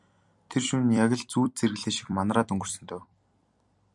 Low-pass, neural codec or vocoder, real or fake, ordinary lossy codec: 10.8 kHz; vocoder, 44.1 kHz, 128 mel bands every 256 samples, BigVGAN v2; fake; MP3, 96 kbps